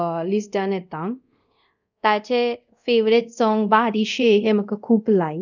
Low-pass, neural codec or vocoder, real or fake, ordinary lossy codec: 7.2 kHz; codec, 24 kHz, 0.5 kbps, DualCodec; fake; none